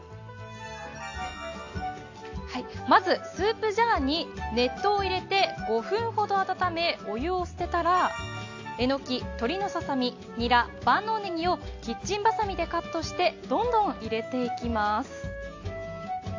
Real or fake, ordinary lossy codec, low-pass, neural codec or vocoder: real; AAC, 48 kbps; 7.2 kHz; none